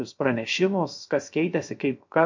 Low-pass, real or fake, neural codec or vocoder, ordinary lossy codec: 7.2 kHz; fake; codec, 16 kHz, 0.3 kbps, FocalCodec; MP3, 48 kbps